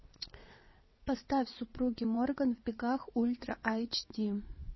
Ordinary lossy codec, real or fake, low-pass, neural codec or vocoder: MP3, 24 kbps; fake; 7.2 kHz; vocoder, 22.05 kHz, 80 mel bands, WaveNeXt